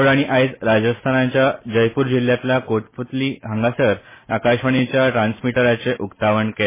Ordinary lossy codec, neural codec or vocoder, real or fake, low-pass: MP3, 16 kbps; none; real; 3.6 kHz